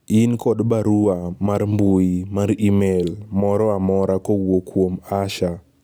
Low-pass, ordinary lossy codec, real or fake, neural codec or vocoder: none; none; real; none